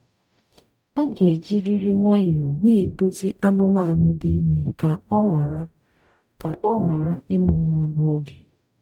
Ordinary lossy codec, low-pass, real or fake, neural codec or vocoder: none; 19.8 kHz; fake; codec, 44.1 kHz, 0.9 kbps, DAC